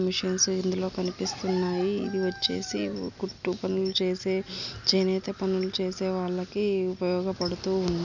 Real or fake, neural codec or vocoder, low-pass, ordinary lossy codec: real; none; 7.2 kHz; none